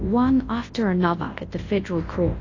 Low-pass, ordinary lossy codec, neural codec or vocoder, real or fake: 7.2 kHz; AAC, 32 kbps; codec, 24 kHz, 0.9 kbps, WavTokenizer, large speech release; fake